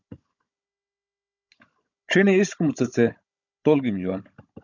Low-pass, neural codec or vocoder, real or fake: 7.2 kHz; codec, 16 kHz, 16 kbps, FunCodec, trained on Chinese and English, 50 frames a second; fake